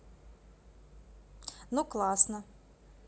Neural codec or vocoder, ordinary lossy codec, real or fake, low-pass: none; none; real; none